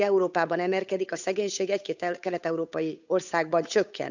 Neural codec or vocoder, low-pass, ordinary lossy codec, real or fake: codec, 16 kHz, 8 kbps, FunCodec, trained on Chinese and English, 25 frames a second; 7.2 kHz; none; fake